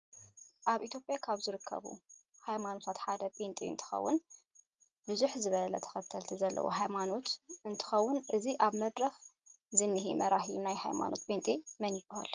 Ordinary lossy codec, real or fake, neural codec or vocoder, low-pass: Opus, 32 kbps; real; none; 7.2 kHz